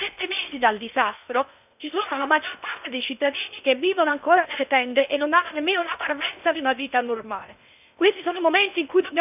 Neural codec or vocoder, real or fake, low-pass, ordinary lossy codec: codec, 16 kHz in and 24 kHz out, 0.8 kbps, FocalCodec, streaming, 65536 codes; fake; 3.6 kHz; none